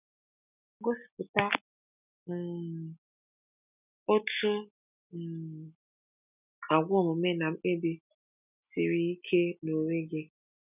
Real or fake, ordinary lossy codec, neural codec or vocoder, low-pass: real; none; none; 3.6 kHz